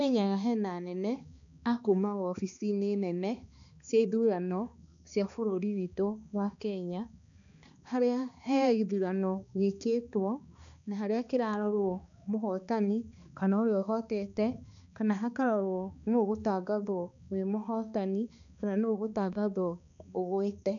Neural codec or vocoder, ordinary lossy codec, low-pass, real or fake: codec, 16 kHz, 2 kbps, X-Codec, HuBERT features, trained on balanced general audio; none; 7.2 kHz; fake